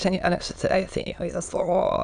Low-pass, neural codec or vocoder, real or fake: 9.9 kHz; autoencoder, 22.05 kHz, a latent of 192 numbers a frame, VITS, trained on many speakers; fake